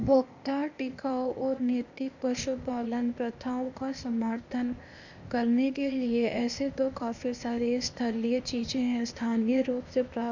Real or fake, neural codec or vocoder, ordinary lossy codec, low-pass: fake; codec, 16 kHz, 0.8 kbps, ZipCodec; none; 7.2 kHz